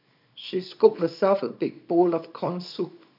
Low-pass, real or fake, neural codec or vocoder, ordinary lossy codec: 5.4 kHz; fake; codec, 24 kHz, 0.9 kbps, WavTokenizer, small release; none